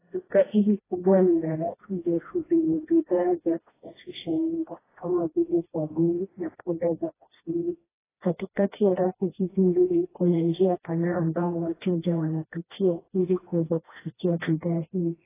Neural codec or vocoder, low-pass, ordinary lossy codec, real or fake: codec, 16 kHz, 1 kbps, FreqCodec, smaller model; 3.6 kHz; AAC, 16 kbps; fake